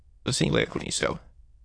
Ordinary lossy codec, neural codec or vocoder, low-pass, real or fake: AAC, 48 kbps; autoencoder, 22.05 kHz, a latent of 192 numbers a frame, VITS, trained on many speakers; 9.9 kHz; fake